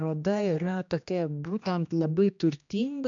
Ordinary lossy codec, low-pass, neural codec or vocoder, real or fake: MP3, 64 kbps; 7.2 kHz; codec, 16 kHz, 1 kbps, X-Codec, HuBERT features, trained on general audio; fake